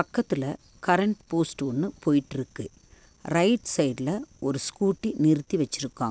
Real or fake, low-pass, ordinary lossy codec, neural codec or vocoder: real; none; none; none